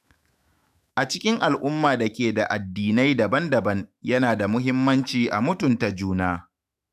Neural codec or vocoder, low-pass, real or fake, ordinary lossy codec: autoencoder, 48 kHz, 128 numbers a frame, DAC-VAE, trained on Japanese speech; 14.4 kHz; fake; none